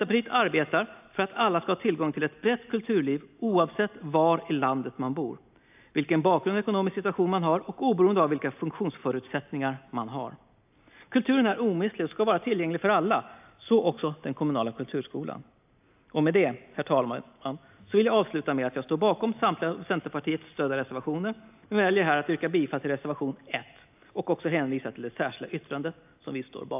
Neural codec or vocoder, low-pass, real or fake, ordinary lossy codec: none; 3.6 kHz; real; AAC, 32 kbps